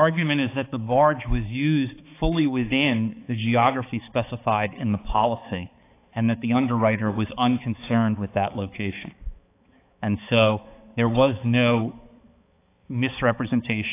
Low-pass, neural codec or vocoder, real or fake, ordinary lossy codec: 3.6 kHz; codec, 16 kHz, 4 kbps, X-Codec, HuBERT features, trained on balanced general audio; fake; AAC, 24 kbps